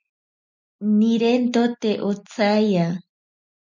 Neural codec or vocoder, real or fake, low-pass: none; real; 7.2 kHz